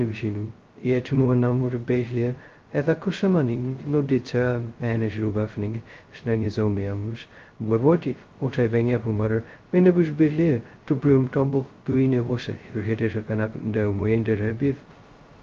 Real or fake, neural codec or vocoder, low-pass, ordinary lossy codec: fake; codec, 16 kHz, 0.2 kbps, FocalCodec; 7.2 kHz; Opus, 32 kbps